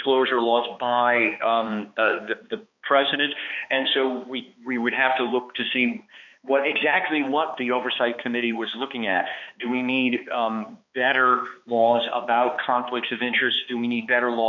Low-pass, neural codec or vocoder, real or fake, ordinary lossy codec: 7.2 kHz; codec, 16 kHz, 2 kbps, X-Codec, HuBERT features, trained on balanced general audio; fake; MP3, 48 kbps